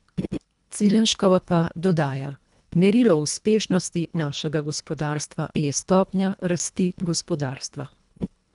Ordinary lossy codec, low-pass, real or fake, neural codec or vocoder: none; 10.8 kHz; fake; codec, 24 kHz, 1.5 kbps, HILCodec